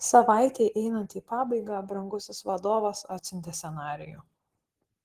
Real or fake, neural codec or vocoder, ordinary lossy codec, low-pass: real; none; Opus, 16 kbps; 14.4 kHz